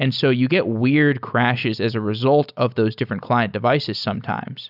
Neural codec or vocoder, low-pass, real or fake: none; 5.4 kHz; real